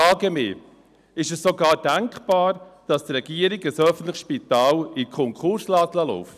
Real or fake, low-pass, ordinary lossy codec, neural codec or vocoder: real; 14.4 kHz; none; none